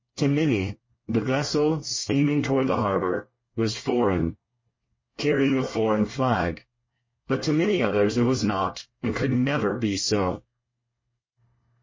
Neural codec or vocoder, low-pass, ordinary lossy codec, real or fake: codec, 24 kHz, 1 kbps, SNAC; 7.2 kHz; MP3, 32 kbps; fake